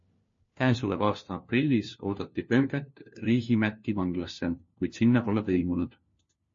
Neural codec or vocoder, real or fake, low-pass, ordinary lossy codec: codec, 16 kHz, 1 kbps, FunCodec, trained on LibriTTS, 50 frames a second; fake; 7.2 kHz; MP3, 32 kbps